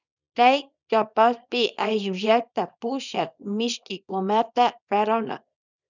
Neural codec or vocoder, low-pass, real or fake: codec, 24 kHz, 0.9 kbps, WavTokenizer, small release; 7.2 kHz; fake